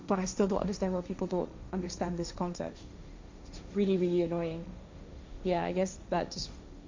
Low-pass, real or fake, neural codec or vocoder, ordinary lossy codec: 7.2 kHz; fake; codec, 16 kHz, 1.1 kbps, Voila-Tokenizer; MP3, 64 kbps